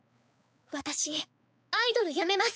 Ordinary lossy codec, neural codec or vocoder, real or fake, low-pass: none; codec, 16 kHz, 4 kbps, X-Codec, HuBERT features, trained on balanced general audio; fake; none